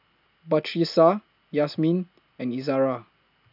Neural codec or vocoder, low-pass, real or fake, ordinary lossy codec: none; 5.4 kHz; real; none